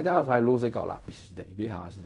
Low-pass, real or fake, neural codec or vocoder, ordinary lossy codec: 10.8 kHz; fake; codec, 16 kHz in and 24 kHz out, 0.4 kbps, LongCat-Audio-Codec, fine tuned four codebook decoder; none